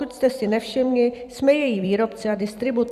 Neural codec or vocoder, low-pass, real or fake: vocoder, 44.1 kHz, 128 mel bands every 256 samples, BigVGAN v2; 14.4 kHz; fake